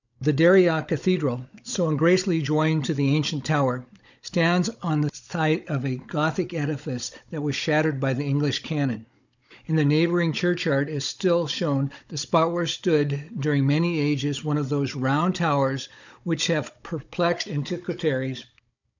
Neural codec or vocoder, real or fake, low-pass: codec, 16 kHz, 16 kbps, FunCodec, trained on Chinese and English, 50 frames a second; fake; 7.2 kHz